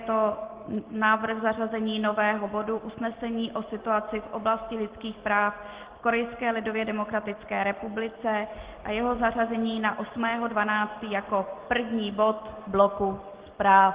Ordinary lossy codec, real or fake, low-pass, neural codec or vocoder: Opus, 16 kbps; real; 3.6 kHz; none